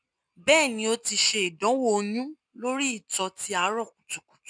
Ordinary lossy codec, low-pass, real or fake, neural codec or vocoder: none; 9.9 kHz; real; none